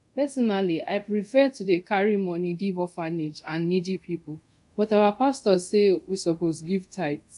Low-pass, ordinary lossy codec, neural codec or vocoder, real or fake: 10.8 kHz; none; codec, 24 kHz, 0.5 kbps, DualCodec; fake